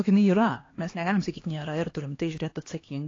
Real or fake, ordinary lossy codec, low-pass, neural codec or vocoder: fake; AAC, 32 kbps; 7.2 kHz; codec, 16 kHz, 2 kbps, X-Codec, HuBERT features, trained on LibriSpeech